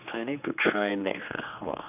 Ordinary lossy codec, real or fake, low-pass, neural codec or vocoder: none; fake; 3.6 kHz; codec, 16 kHz, 2 kbps, X-Codec, HuBERT features, trained on general audio